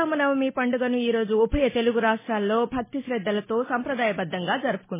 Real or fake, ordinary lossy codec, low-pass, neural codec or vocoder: real; MP3, 16 kbps; 3.6 kHz; none